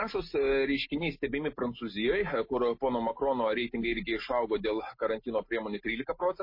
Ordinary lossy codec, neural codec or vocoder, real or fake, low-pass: MP3, 24 kbps; none; real; 5.4 kHz